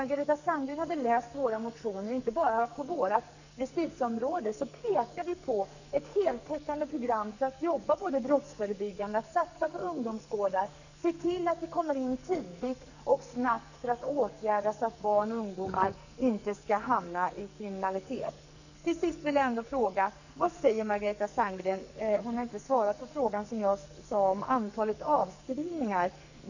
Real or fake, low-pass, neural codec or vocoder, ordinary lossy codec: fake; 7.2 kHz; codec, 44.1 kHz, 2.6 kbps, SNAC; none